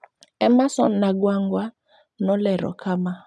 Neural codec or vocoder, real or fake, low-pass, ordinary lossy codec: none; real; none; none